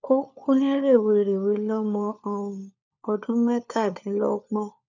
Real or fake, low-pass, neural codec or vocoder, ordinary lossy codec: fake; 7.2 kHz; codec, 16 kHz, 4 kbps, FunCodec, trained on LibriTTS, 50 frames a second; none